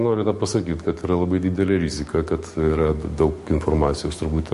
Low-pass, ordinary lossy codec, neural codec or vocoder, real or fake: 14.4 kHz; MP3, 48 kbps; codec, 44.1 kHz, 7.8 kbps, DAC; fake